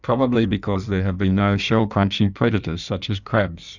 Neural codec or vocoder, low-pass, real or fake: codec, 16 kHz in and 24 kHz out, 1.1 kbps, FireRedTTS-2 codec; 7.2 kHz; fake